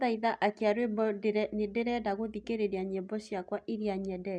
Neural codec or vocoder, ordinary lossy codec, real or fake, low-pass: none; none; real; 9.9 kHz